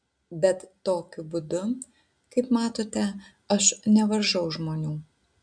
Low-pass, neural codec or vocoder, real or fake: 9.9 kHz; none; real